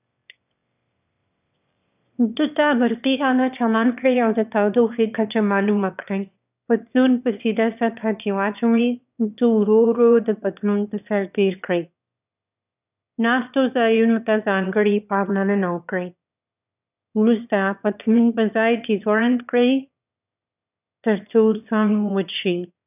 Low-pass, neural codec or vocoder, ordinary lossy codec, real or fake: 3.6 kHz; autoencoder, 22.05 kHz, a latent of 192 numbers a frame, VITS, trained on one speaker; none; fake